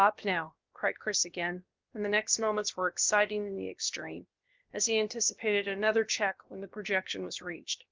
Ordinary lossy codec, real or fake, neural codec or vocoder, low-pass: Opus, 32 kbps; fake; codec, 16 kHz, about 1 kbps, DyCAST, with the encoder's durations; 7.2 kHz